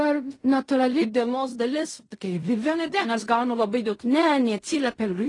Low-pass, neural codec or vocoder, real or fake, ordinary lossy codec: 10.8 kHz; codec, 16 kHz in and 24 kHz out, 0.4 kbps, LongCat-Audio-Codec, fine tuned four codebook decoder; fake; AAC, 32 kbps